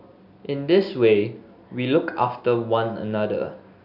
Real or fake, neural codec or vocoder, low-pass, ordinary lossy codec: real; none; 5.4 kHz; none